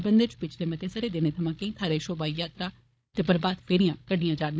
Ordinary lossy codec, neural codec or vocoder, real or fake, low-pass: none; codec, 16 kHz, 16 kbps, FunCodec, trained on Chinese and English, 50 frames a second; fake; none